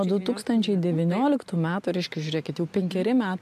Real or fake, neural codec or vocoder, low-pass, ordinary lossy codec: real; none; 14.4 kHz; MP3, 64 kbps